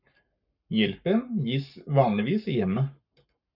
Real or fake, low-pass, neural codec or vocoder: fake; 5.4 kHz; codec, 44.1 kHz, 7.8 kbps, Pupu-Codec